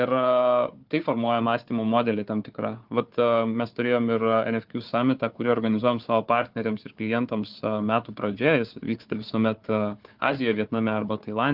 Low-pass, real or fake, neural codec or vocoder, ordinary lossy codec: 5.4 kHz; fake; codec, 16 kHz, 4 kbps, FunCodec, trained on Chinese and English, 50 frames a second; Opus, 24 kbps